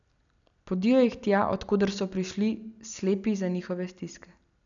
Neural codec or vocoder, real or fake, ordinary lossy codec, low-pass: none; real; none; 7.2 kHz